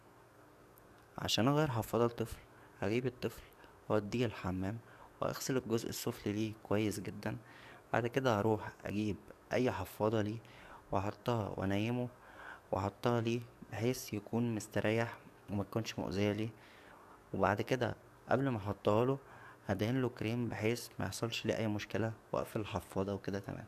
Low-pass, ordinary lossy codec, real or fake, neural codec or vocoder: 14.4 kHz; none; fake; codec, 44.1 kHz, 7.8 kbps, DAC